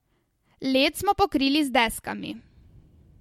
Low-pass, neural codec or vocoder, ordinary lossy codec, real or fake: 19.8 kHz; none; MP3, 64 kbps; real